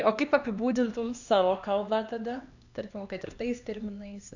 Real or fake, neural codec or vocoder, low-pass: fake; codec, 16 kHz, 2 kbps, X-Codec, HuBERT features, trained on LibriSpeech; 7.2 kHz